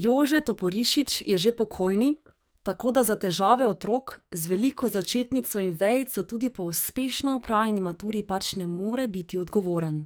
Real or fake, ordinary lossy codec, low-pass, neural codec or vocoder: fake; none; none; codec, 44.1 kHz, 2.6 kbps, SNAC